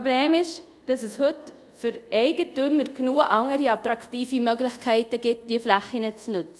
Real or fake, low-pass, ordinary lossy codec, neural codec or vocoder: fake; none; none; codec, 24 kHz, 0.5 kbps, DualCodec